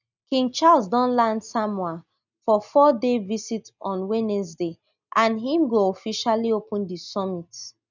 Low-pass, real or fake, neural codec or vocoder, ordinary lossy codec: 7.2 kHz; real; none; none